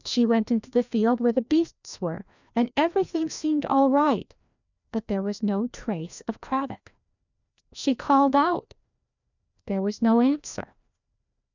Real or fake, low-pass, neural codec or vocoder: fake; 7.2 kHz; codec, 16 kHz, 1 kbps, FreqCodec, larger model